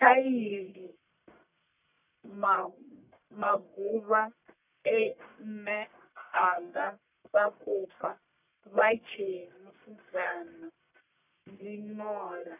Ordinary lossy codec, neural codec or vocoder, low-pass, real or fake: none; codec, 44.1 kHz, 1.7 kbps, Pupu-Codec; 3.6 kHz; fake